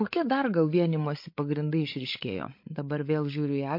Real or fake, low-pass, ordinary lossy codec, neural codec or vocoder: fake; 5.4 kHz; MP3, 32 kbps; codec, 16 kHz, 16 kbps, FreqCodec, larger model